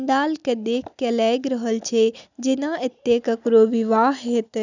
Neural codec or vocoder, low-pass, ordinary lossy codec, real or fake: none; 7.2 kHz; none; real